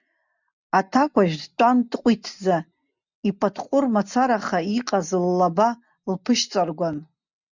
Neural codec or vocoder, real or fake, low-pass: none; real; 7.2 kHz